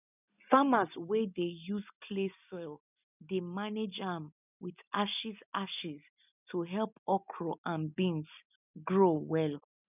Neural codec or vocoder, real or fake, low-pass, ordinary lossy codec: none; real; 3.6 kHz; none